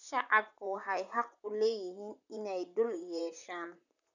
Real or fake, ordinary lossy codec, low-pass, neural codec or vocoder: fake; none; 7.2 kHz; vocoder, 44.1 kHz, 128 mel bands, Pupu-Vocoder